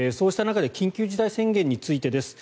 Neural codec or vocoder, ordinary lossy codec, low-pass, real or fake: none; none; none; real